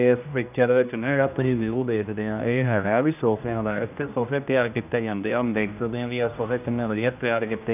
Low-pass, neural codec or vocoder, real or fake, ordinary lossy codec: 3.6 kHz; codec, 16 kHz, 1 kbps, X-Codec, HuBERT features, trained on general audio; fake; none